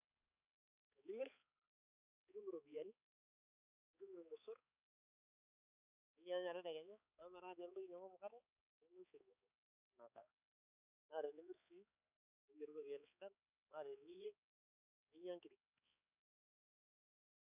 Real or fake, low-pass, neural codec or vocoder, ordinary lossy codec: fake; 3.6 kHz; codec, 16 kHz, 4 kbps, X-Codec, HuBERT features, trained on balanced general audio; none